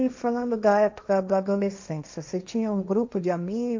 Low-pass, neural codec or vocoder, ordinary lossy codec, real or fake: 7.2 kHz; codec, 16 kHz, 1.1 kbps, Voila-Tokenizer; MP3, 64 kbps; fake